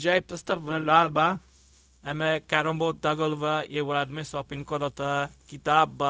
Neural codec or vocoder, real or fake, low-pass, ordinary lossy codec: codec, 16 kHz, 0.4 kbps, LongCat-Audio-Codec; fake; none; none